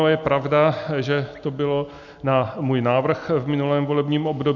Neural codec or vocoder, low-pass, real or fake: none; 7.2 kHz; real